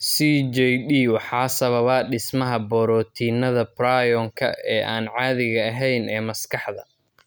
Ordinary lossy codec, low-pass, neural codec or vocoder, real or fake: none; none; none; real